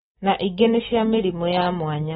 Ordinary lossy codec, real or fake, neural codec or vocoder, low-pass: AAC, 16 kbps; real; none; 19.8 kHz